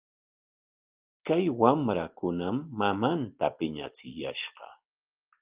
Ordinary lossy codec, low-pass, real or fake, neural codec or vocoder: Opus, 24 kbps; 3.6 kHz; real; none